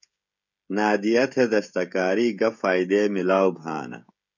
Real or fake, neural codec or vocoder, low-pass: fake; codec, 16 kHz, 16 kbps, FreqCodec, smaller model; 7.2 kHz